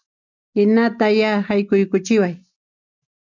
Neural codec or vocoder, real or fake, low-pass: none; real; 7.2 kHz